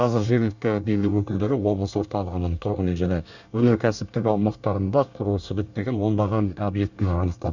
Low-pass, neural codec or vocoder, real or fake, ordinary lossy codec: 7.2 kHz; codec, 24 kHz, 1 kbps, SNAC; fake; none